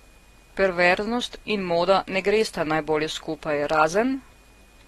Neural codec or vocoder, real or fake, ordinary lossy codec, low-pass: none; real; AAC, 32 kbps; 19.8 kHz